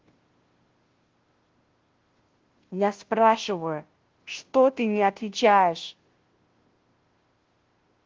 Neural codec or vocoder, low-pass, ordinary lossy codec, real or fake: codec, 16 kHz, 0.5 kbps, FunCodec, trained on Chinese and English, 25 frames a second; 7.2 kHz; Opus, 16 kbps; fake